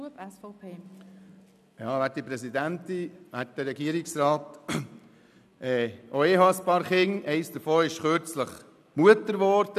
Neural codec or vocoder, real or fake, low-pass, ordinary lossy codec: none; real; 14.4 kHz; none